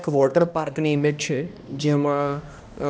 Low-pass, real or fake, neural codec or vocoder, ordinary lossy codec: none; fake; codec, 16 kHz, 1 kbps, X-Codec, HuBERT features, trained on balanced general audio; none